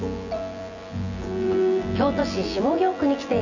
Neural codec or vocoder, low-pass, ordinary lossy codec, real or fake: vocoder, 24 kHz, 100 mel bands, Vocos; 7.2 kHz; none; fake